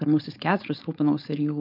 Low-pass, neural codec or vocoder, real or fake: 5.4 kHz; codec, 16 kHz, 4.8 kbps, FACodec; fake